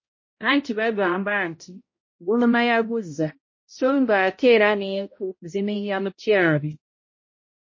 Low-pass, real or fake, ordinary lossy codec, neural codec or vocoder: 7.2 kHz; fake; MP3, 32 kbps; codec, 16 kHz, 0.5 kbps, X-Codec, HuBERT features, trained on balanced general audio